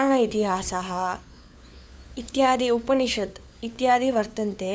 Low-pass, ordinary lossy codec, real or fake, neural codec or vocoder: none; none; fake; codec, 16 kHz, 4 kbps, FunCodec, trained on LibriTTS, 50 frames a second